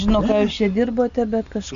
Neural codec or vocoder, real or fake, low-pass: none; real; 7.2 kHz